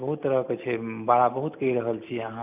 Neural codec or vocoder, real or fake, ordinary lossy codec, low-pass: none; real; none; 3.6 kHz